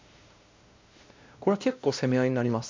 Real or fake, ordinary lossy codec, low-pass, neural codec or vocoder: fake; MP3, 64 kbps; 7.2 kHz; codec, 16 kHz, 1 kbps, X-Codec, WavLM features, trained on Multilingual LibriSpeech